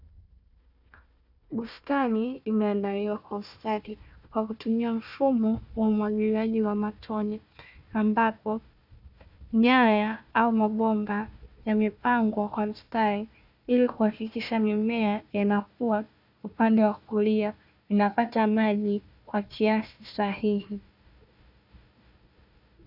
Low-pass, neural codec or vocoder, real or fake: 5.4 kHz; codec, 16 kHz, 1 kbps, FunCodec, trained on Chinese and English, 50 frames a second; fake